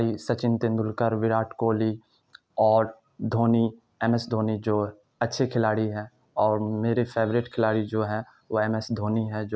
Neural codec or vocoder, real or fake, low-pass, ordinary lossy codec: none; real; none; none